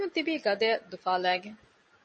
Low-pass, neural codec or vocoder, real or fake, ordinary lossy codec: 10.8 kHz; none; real; MP3, 32 kbps